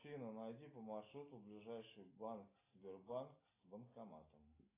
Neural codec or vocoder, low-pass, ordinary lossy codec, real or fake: none; 3.6 kHz; AAC, 16 kbps; real